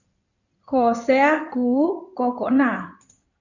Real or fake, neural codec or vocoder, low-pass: fake; codec, 16 kHz in and 24 kHz out, 2.2 kbps, FireRedTTS-2 codec; 7.2 kHz